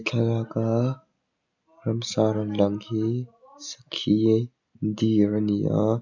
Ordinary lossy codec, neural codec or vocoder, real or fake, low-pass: none; none; real; 7.2 kHz